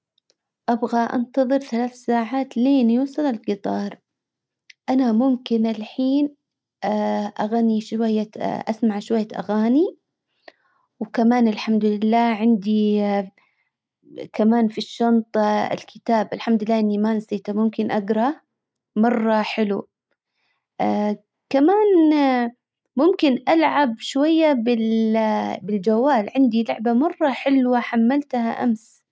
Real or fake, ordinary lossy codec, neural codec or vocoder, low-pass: real; none; none; none